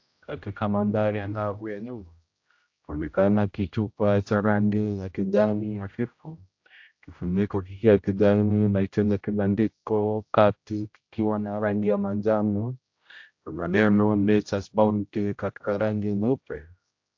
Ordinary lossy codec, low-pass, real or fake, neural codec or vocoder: AAC, 48 kbps; 7.2 kHz; fake; codec, 16 kHz, 0.5 kbps, X-Codec, HuBERT features, trained on general audio